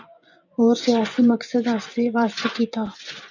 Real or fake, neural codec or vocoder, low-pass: fake; vocoder, 24 kHz, 100 mel bands, Vocos; 7.2 kHz